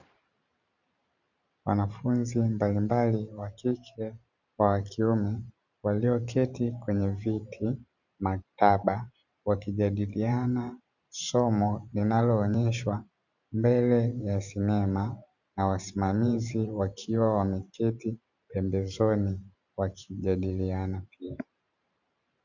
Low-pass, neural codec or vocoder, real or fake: 7.2 kHz; vocoder, 44.1 kHz, 128 mel bands every 512 samples, BigVGAN v2; fake